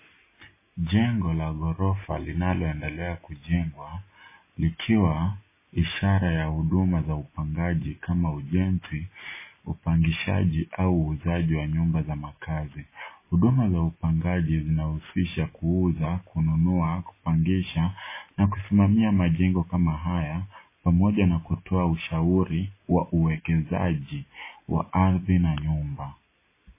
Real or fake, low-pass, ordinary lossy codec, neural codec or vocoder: real; 3.6 kHz; MP3, 16 kbps; none